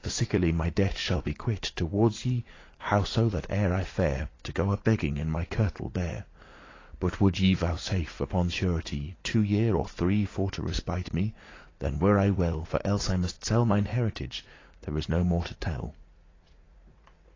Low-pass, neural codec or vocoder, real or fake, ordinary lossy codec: 7.2 kHz; vocoder, 44.1 kHz, 80 mel bands, Vocos; fake; AAC, 32 kbps